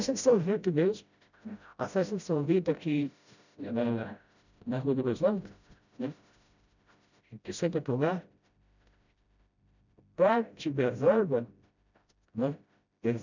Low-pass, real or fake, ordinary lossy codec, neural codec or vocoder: 7.2 kHz; fake; none; codec, 16 kHz, 0.5 kbps, FreqCodec, smaller model